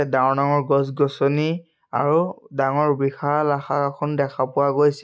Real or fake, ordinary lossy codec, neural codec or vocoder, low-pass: real; none; none; none